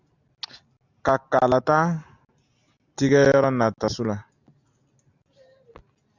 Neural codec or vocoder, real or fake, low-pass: none; real; 7.2 kHz